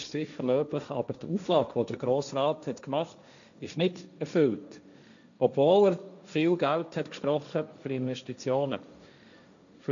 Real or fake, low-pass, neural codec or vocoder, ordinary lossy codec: fake; 7.2 kHz; codec, 16 kHz, 1.1 kbps, Voila-Tokenizer; none